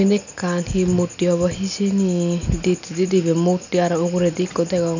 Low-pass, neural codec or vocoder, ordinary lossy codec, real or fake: 7.2 kHz; none; none; real